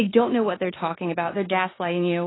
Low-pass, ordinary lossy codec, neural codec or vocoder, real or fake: 7.2 kHz; AAC, 16 kbps; codec, 16 kHz in and 24 kHz out, 0.9 kbps, LongCat-Audio-Codec, four codebook decoder; fake